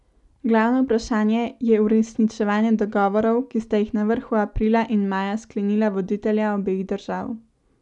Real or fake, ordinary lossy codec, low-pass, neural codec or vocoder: real; none; 10.8 kHz; none